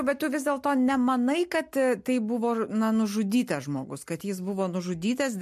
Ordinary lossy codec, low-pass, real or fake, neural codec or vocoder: MP3, 64 kbps; 14.4 kHz; real; none